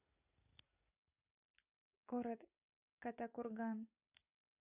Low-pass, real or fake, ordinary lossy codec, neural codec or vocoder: 3.6 kHz; real; AAC, 32 kbps; none